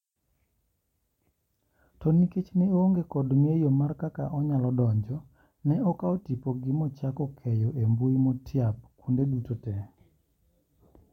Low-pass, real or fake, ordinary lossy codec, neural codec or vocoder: 19.8 kHz; real; MP3, 64 kbps; none